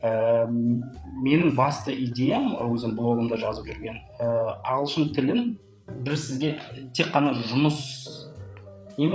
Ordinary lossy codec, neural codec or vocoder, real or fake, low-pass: none; codec, 16 kHz, 8 kbps, FreqCodec, larger model; fake; none